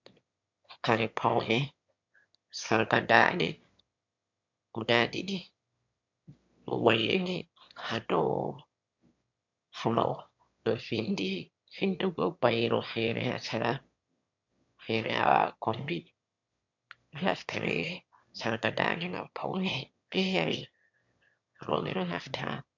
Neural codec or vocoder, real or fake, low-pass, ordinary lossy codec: autoencoder, 22.05 kHz, a latent of 192 numbers a frame, VITS, trained on one speaker; fake; 7.2 kHz; MP3, 64 kbps